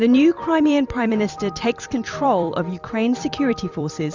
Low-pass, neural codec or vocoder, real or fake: 7.2 kHz; vocoder, 44.1 kHz, 128 mel bands every 256 samples, BigVGAN v2; fake